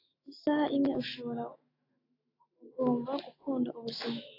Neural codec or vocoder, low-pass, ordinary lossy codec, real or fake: autoencoder, 48 kHz, 128 numbers a frame, DAC-VAE, trained on Japanese speech; 5.4 kHz; AAC, 24 kbps; fake